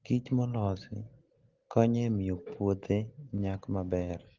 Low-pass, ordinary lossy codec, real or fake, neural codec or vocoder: 7.2 kHz; Opus, 16 kbps; real; none